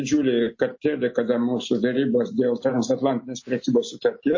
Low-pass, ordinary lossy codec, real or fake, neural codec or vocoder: 7.2 kHz; MP3, 32 kbps; real; none